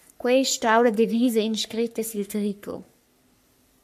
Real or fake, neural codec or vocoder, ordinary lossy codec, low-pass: fake; codec, 44.1 kHz, 3.4 kbps, Pupu-Codec; AAC, 96 kbps; 14.4 kHz